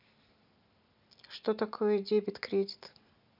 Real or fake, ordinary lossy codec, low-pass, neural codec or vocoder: real; none; 5.4 kHz; none